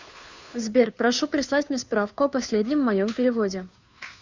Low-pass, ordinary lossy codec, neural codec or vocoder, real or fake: 7.2 kHz; Opus, 64 kbps; codec, 16 kHz, 2 kbps, FunCodec, trained on Chinese and English, 25 frames a second; fake